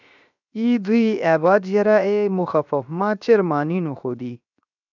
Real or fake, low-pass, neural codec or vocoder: fake; 7.2 kHz; codec, 16 kHz, 0.7 kbps, FocalCodec